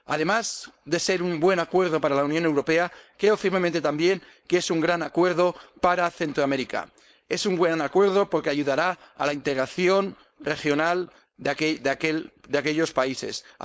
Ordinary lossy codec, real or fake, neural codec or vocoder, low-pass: none; fake; codec, 16 kHz, 4.8 kbps, FACodec; none